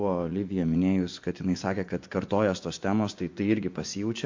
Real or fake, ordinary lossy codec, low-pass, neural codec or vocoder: fake; MP3, 48 kbps; 7.2 kHz; vocoder, 24 kHz, 100 mel bands, Vocos